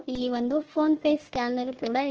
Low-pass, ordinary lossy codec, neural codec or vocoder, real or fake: 7.2 kHz; Opus, 16 kbps; codec, 16 kHz, 1 kbps, FunCodec, trained on Chinese and English, 50 frames a second; fake